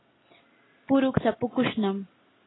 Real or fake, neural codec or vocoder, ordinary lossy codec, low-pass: real; none; AAC, 16 kbps; 7.2 kHz